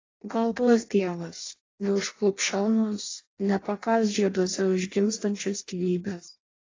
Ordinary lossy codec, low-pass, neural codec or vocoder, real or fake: AAC, 32 kbps; 7.2 kHz; codec, 16 kHz in and 24 kHz out, 0.6 kbps, FireRedTTS-2 codec; fake